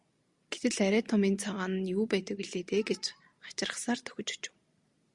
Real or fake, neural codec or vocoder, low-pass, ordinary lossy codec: real; none; 10.8 kHz; Opus, 64 kbps